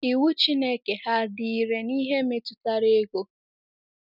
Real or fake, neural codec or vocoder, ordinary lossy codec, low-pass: real; none; none; 5.4 kHz